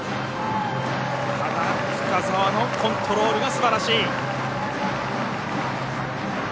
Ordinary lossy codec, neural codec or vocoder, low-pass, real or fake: none; none; none; real